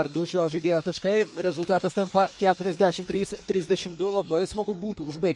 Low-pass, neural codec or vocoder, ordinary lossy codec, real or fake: 10.8 kHz; codec, 24 kHz, 1 kbps, SNAC; MP3, 48 kbps; fake